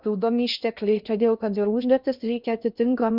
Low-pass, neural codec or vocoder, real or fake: 5.4 kHz; codec, 16 kHz in and 24 kHz out, 0.6 kbps, FocalCodec, streaming, 2048 codes; fake